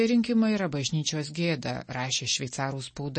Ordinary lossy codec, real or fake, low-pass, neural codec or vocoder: MP3, 32 kbps; real; 10.8 kHz; none